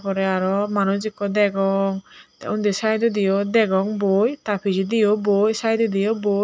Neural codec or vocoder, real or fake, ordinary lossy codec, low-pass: none; real; none; none